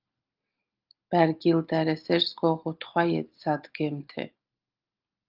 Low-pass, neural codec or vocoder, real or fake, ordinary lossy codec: 5.4 kHz; none; real; Opus, 32 kbps